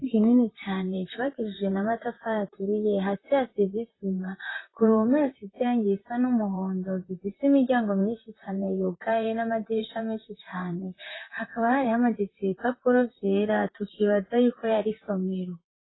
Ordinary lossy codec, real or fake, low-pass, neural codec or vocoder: AAC, 16 kbps; fake; 7.2 kHz; vocoder, 44.1 kHz, 128 mel bands, Pupu-Vocoder